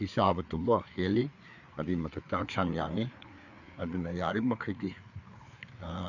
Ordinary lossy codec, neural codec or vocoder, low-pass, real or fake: none; codec, 16 kHz, 4 kbps, FreqCodec, larger model; 7.2 kHz; fake